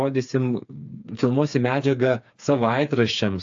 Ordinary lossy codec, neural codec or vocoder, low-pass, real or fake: AAC, 64 kbps; codec, 16 kHz, 4 kbps, FreqCodec, smaller model; 7.2 kHz; fake